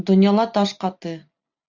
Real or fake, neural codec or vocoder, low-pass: real; none; 7.2 kHz